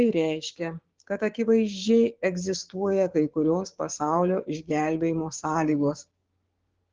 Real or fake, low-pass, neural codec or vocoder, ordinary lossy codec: fake; 7.2 kHz; codec, 16 kHz, 6 kbps, DAC; Opus, 16 kbps